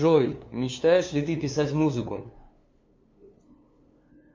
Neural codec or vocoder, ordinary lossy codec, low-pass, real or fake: codec, 16 kHz, 2 kbps, FunCodec, trained on LibriTTS, 25 frames a second; MP3, 48 kbps; 7.2 kHz; fake